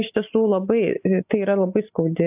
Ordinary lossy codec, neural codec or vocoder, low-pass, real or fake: AAC, 32 kbps; none; 3.6 kHz; real